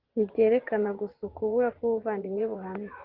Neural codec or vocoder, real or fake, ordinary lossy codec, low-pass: vocoder, 24 kHz, 100 mel bands, Vocos; fake; Opus, 16 kbps; 5.4 kHz